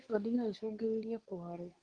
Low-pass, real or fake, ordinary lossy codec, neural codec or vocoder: 9.9 kHz; fake; Opus, 24 kbps; codec, 24 kHz, 0.9 kbps, WavTokenizer, medium speech release version 2